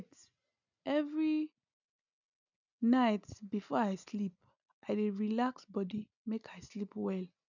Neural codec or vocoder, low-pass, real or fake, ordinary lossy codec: none; 7.2 kHz; real; none